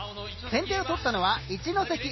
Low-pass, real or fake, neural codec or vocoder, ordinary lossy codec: 7.2 kHz; real; none; MP3, 24 kbps